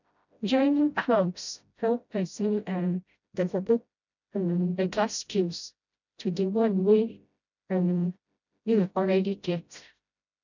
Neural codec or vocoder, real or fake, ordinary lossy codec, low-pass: codec, 16 kHz, 0.5 kbps, FreqCodec, smaller model; fake; none; 7.2 kHz